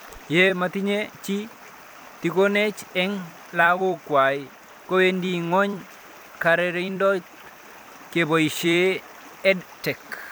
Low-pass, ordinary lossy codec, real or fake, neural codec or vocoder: none; none; fake; vocoder, 44.1 kHz, 128 mel bands every 256 samples, BigVGAN v2